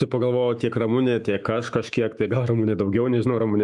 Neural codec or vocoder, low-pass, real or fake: autoencoder, 48 kHz, 128 numbers a frame, DAC-VAE, trained on Japanese speech; 10.8 kHz; fake